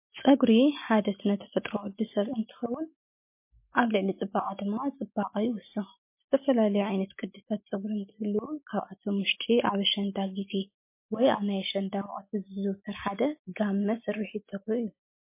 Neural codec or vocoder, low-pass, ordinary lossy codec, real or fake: none; 3.6 kHz; MP3, 24 kbps; real